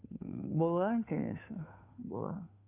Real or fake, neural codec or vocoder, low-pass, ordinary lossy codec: fake; codec, 16 kHz, 2 kbps, FunCodec, trained on Chinese and English, 25 frames a second; 3.6 kHz; none